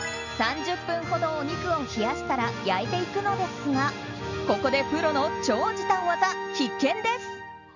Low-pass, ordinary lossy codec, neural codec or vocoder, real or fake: 7.2 kHz; none; none; real